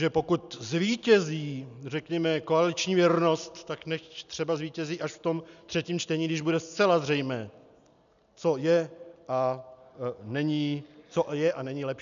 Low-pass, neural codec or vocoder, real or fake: 7.2 kHz; none; real